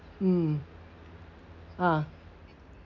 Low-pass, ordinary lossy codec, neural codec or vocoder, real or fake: 7.2 kHz; none; none; real